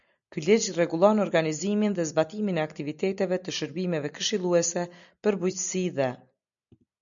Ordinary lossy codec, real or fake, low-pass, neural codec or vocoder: MP3, 96 kbps; real; 7.2 kHz; none